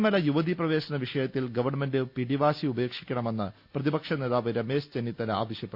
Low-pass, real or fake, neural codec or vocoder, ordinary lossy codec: 5.4 kHz; real; none; Opus, 64 kbps